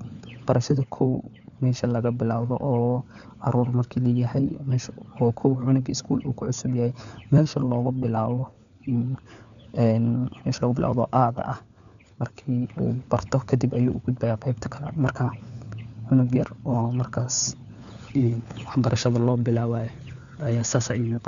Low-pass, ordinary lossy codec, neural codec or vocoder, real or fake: 7.2 kHz; none; codec, 16 kHz, 4 kbps, FunCodec, trained on LibriTTS, 50 frames a second; fake